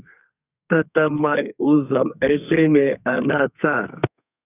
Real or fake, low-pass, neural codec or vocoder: fake; 3.6 kHz; codec, 32 kHz, 1.9 kbps, SNAC